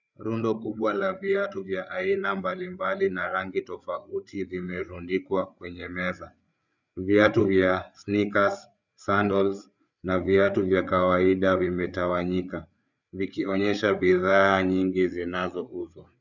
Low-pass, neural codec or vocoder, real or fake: 7.2 kHz; codec, 16 kHz, 8 kbps, FreqCodec, larger model; fake